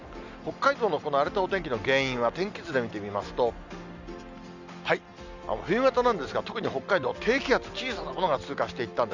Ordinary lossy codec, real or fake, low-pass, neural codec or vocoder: none; real; 7.2 kHz; none